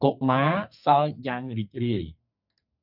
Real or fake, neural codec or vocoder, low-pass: fake; codec, 44.1 kHz, 2.6 kbps, SNAC; 5.4 kHz